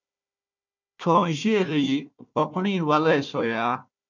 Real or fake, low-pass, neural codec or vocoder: fake; 7.2 kHz; codec, 16 kHz, 1 kbps, FunCodec, trained on Chinese and English, 50 frames a second